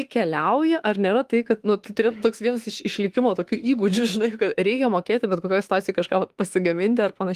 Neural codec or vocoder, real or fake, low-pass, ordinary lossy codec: autoencoder, 48 kHz, 32 numbers a frame, DAC-VAE, trained on Japanese speech; fake; 14.4 kHz; Opus, 24 kbps